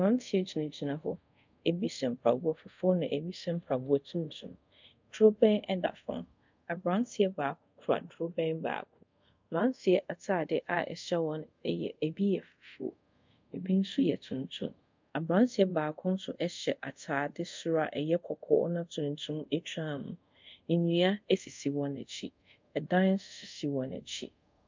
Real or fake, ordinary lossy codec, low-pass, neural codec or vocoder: fake; MP3, 64 kbps; 7.2 kHz; codec, 24 kHz, 0.5 kbps, DualCodec